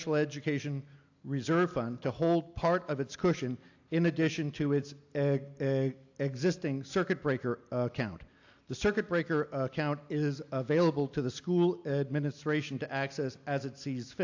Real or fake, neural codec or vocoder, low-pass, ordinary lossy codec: real; none; 7.2 kHz; AAC, 48 kbps